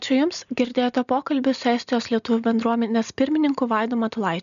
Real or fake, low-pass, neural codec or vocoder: real; 7.2 kHz; none